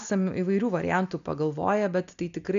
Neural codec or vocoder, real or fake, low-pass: none; real; 7.2 kHz